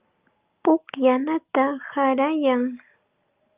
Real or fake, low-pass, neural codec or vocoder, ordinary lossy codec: real; 3.6 kHz; none; Opus, 24 kbps